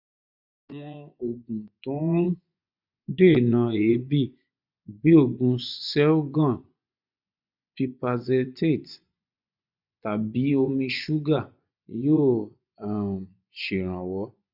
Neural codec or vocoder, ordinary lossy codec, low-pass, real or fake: vocoder, 24 kHz, 100 mel bands, Vocos; none; 5.4 kHz; fake